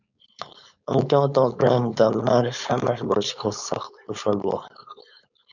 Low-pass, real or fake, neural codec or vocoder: 7.2 kHz; fake; codec, 16 kHz, 4.8 kbps, FACodec